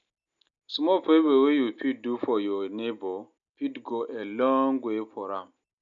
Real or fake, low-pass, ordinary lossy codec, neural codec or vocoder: real; 7.2 kHz; none; none